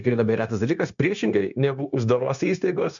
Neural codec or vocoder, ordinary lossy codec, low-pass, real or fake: codec, 16 kHz, 0.9 kbps, LongCat-Audio-Codec; MP3, 96 kbps; 7.2 kHz; fake